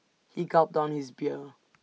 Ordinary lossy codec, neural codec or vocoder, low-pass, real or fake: none; none; none; real